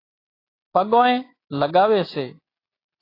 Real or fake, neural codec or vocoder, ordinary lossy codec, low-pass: real; none; AAC, 24 kbps; 5.4 kHz